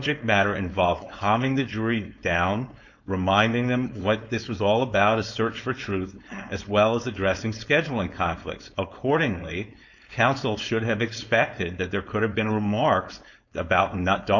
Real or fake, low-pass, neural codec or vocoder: fake; 7.2 kHz; codec, 16 kHz, 4.8 kbps, FACodec